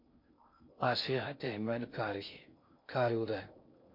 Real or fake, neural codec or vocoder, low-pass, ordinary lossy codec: fake; codec, 16 kHz in and 24 kHz out, 0.6 kbps, FocalCodec, streaming, 2048 codes; 5.4 kHz; MP3, 32 kbps